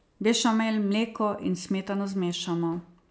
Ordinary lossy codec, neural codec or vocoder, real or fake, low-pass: none; none; real; none